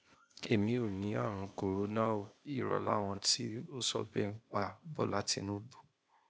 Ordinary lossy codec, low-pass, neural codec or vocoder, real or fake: none; none; codec, 16 kHz, 0.8 kbps, ZipCodec; fake